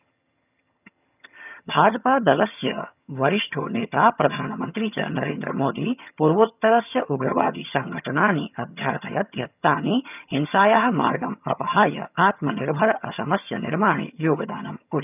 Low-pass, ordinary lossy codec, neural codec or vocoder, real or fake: 3.6 kHz; none; vocoder, 22.05 kHz, 80 mel bands, HiFi-GAN; fake